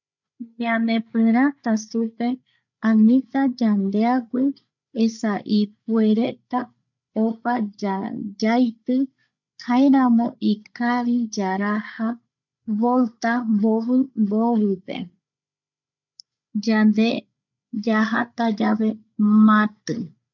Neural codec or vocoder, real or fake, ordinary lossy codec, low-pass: codec, 16 kHz, 16 kbps, FreqCodec, larger model; fake; none; 7.2 kHz